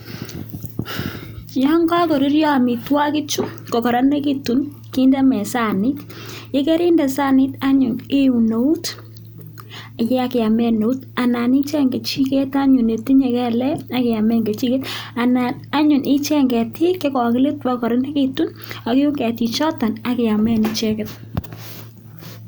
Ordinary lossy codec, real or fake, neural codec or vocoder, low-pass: none; real; none; none